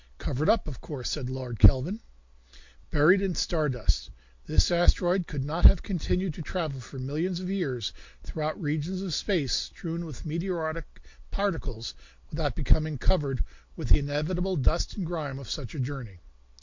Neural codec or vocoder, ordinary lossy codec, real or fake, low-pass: none; MP3, 48 kbps; real; 7.2 kHz